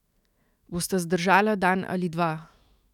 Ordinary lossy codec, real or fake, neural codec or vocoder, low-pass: none; fake; autoencoder, 48 kHz, 128 numbers a frame, DAC-VAE, trained on Japanese speech; 19.8 kHz